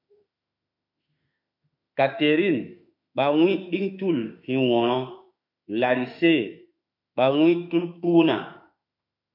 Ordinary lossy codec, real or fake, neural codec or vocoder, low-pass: MP3, 48 kbps; fake; autoencoder, 48 kHz, 32 numbers a frame, DAC-VAE, trained on Japanese speech; 5.4 kHz